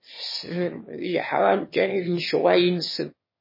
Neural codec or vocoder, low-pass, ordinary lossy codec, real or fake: autoencoder, 22.05 kHz, a latent of 192 numbers a frame, VITS, trained on one speaker; 5.4 kHz; MP3, 24 kbps; fake